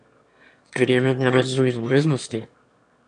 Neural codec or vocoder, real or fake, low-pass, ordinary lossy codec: autoencoder, 22.05 kHz, a latent of 192 numbers a frame, VITS, trained on one speaker; fake; 9.9 kHz; none